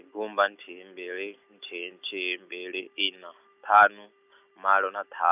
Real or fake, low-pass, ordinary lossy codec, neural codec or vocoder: real; 3.6 kHz; none; none